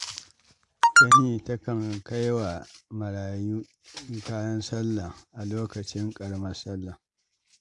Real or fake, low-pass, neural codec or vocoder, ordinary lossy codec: real; 10.8 kHz; none; AAC, 64 kbps